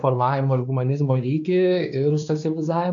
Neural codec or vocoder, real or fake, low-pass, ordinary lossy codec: codec, 16 kHz, 2 kbps, X-Codec, WavLM features, trained on Multilingual LibriSpeech; fake; 7.2 kHz; AAC, 64 kbps